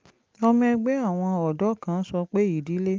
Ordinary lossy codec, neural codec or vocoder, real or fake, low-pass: Opus, 24 kbps; none; real; 7.2 kHz